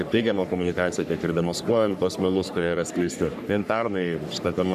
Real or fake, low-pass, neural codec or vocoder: fake; 14.4 kHz; codec, 44.1 kHz, 3.4 kbps, Pupu-Codec